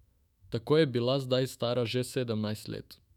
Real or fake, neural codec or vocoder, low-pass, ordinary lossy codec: fake; autoencoder, 48 kHz, 128 numbers a frame, DAC-VAE, trained on Japanese speech; 19.8 kHz; none